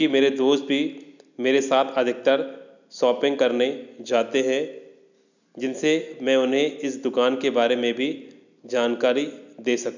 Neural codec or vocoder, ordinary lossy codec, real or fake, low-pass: none; none; real; 7.2 kHz